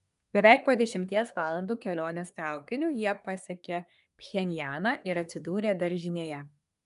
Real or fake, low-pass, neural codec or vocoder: fake; 10.8 kHz; codec, 24 kHz, 1 kbps, SNAC